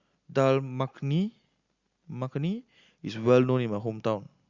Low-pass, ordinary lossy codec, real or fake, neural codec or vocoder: 7.2 kHz; Opus, 64 kbps; real; none